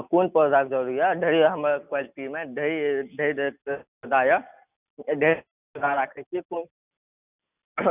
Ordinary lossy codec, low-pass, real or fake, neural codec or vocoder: none; 3.6 kHz; real; none